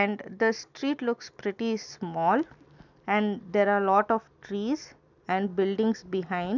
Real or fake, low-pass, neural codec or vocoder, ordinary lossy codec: real; 7.2 kHz; none; none